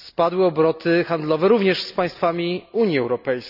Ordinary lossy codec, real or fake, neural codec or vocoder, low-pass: none; real; none; 5.4 kHz